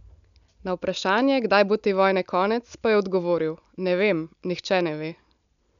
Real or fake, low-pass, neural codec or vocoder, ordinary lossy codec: real; 7.2 kHz; none; none